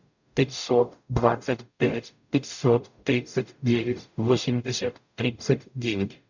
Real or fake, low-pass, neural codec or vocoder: fake; 7.2 kHz; codec, 44.1 kHz, 0.9 kbps, DAC